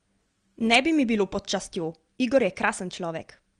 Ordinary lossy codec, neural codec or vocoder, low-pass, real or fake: Opus, 24 kbps; none; 9.9 kHz; real